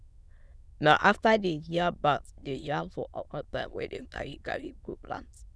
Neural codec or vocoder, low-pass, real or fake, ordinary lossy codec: autoencoder, 22.05 kHz, a latent of 192 numbers a frame, VITS, trained on many speakers; none; fake; none